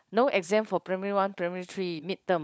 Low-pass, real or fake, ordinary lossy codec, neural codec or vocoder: none; fake; none; codec, 16 kHz, 16 kbps, FunCodec, trained on LibriTTS, 50 frames a second